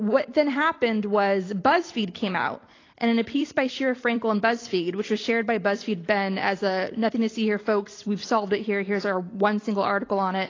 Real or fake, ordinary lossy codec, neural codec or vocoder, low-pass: fake; AAC, 32 kbps; vocoder, 44.1 kHz, 128 mel bands every 256 samples, BigVGAN v2; 7.2 kHz